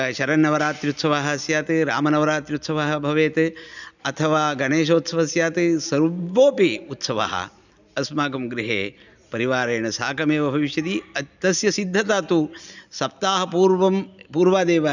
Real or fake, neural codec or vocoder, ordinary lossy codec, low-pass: real; none; none; 7.2 kHz